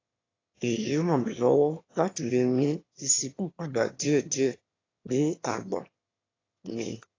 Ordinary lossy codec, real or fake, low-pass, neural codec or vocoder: AAC, 32 kbps; fake; 7.2 kHz; autoencoder, 22.05 kHz, a latent of 192 numbers a frame, VITS, trained on one speaker